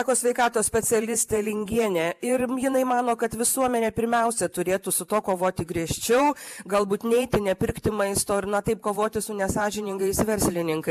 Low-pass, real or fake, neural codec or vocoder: 14.4 kHz; fake; vocoder, 44.1 kHz, 128 mel bands every 512 samples, BigVGAN v2